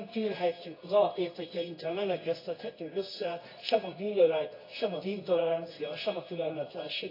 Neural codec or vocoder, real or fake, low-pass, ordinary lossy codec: codec, 24 kHz, 0.9 kbps, WavTokenizer, medium music audio release; fake; 5.4 kHz; AAC, 24 kbps